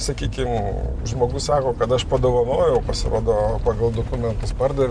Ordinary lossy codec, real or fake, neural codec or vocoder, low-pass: Opus, 64 kbps; real; none; 9.9 kHz